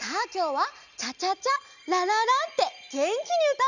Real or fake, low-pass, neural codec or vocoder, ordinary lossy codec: real; 7.2 kHz; none; none